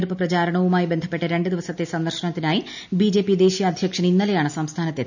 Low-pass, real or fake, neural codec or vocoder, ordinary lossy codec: 7.2 kHz; real; none; none